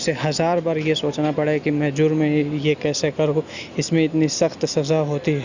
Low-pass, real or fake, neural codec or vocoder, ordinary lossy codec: 7.2 kHz; real; none; Opus, 64 kbps